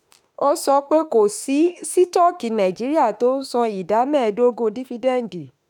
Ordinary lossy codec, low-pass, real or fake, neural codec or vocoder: none; none; fake; autoencoder, 48 kHz, 32 numbers a frame, DAC-VAE, trained on Japanese speech